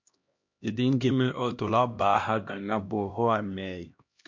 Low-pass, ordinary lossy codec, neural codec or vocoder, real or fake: 7.2 kHz; MP3, 48 kbps; codec, 16 kHz, 1 kbps, X-Codec, HuBERT features, trained on LibriSpeech; fake